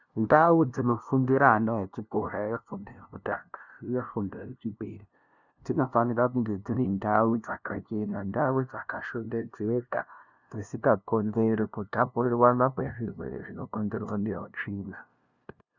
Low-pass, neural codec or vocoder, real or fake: 7.2 kHz; codec, 16 kHz, 0.5 kbps, FunCodec, trained on LibriTTS, 25 frames a second; fake